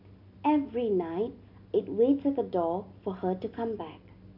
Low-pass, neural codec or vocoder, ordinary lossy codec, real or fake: 5.4 kHz; none; AAC, 48 kbps; real